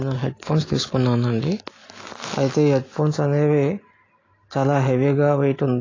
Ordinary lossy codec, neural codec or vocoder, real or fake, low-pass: AAC, 32 kbps; none; real; 7.2 kHz